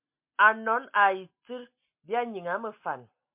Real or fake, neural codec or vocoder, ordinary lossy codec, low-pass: real; none; MP3, 32 kbps; 3.6 kHz